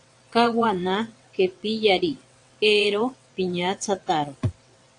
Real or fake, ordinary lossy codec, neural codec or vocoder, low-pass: fake; AAC, 64 kbps; vocoder, 22.05 kHz, 80 mel bands, WaveNeXt; 9.9 kHz